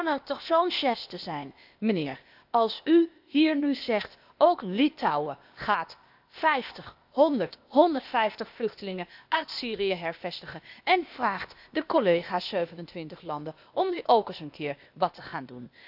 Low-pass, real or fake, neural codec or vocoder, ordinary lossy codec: 5.4 kHz; fake; codec, 16 kHz, 0.8 kbps, ZipCodec; none